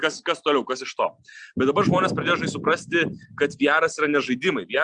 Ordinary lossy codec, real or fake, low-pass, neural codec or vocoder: Opus, 64 kbps; real; 9.9 kHz; none